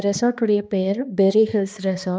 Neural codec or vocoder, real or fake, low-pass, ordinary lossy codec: codec, 16 kHz, 2 kbps, X-Codec, HuBERT features, trained on balanced general audio; fake; none; none